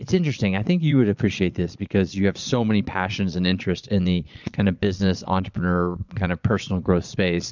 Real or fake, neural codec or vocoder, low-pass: fake; vocoder, 22.05 kHz, 80 mel bands, Vocos; 7.2 kHz